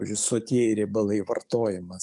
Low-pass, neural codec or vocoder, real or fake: 10.8 kHz; codec, 44.1 kHz, 7.8 kbps, DAC; fake